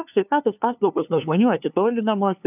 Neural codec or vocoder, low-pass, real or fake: codec, 16 kHz, 2 kbps, FunCodec, trained on LibriTTS, 25 frames a second; 3.6 kHz; fake